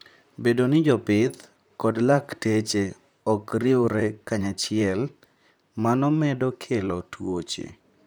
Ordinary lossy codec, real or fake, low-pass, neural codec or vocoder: none; fake; none; vocoder, 44.1 kHz, 128 mel bands, Pupu-Vocoder